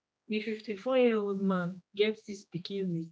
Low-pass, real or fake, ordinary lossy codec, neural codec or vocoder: none; fake; none; codec, 16 kHz, 1 kbps, X-Codec, HuBERT features, trained on general audio